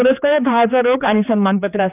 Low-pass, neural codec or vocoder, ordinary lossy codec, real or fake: 3.6 kHz; codec, 16 kHz, 2 kbps, X-Codec, HuBERT features, trained on general audio; none; fake